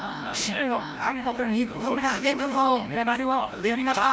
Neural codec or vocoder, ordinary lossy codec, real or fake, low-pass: codec, 16 kHz, 0.5 kbps, FreqCodec, larger model; none; fake; none